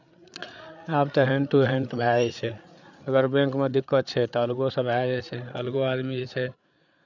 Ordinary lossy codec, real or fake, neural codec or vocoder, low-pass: none; fake; codec, 16 kHz, 8 kbps, FreqCodec, larger model; 7.2 kHz